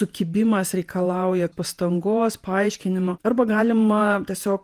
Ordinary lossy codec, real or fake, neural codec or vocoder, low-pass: Opus, 24 kbps; fake; vocoder, 48 kHz, 128 mel bands, Vocos; 14.4 kHz